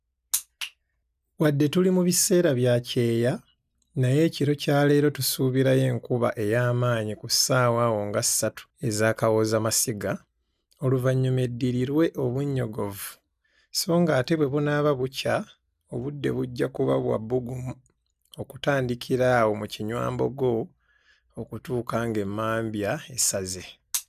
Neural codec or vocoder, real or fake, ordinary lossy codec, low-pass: none; real; none; 14.4 kHz